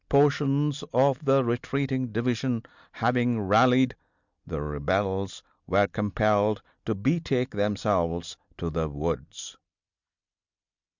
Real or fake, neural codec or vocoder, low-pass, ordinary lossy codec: real; none; 7.2 kHz; Opus, 64 kbps